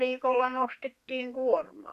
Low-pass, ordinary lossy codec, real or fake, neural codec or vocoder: 14.4 kHz; MP3, 96 kbps; fake; codec, 32 kHz, 1.9 kbps, SNAC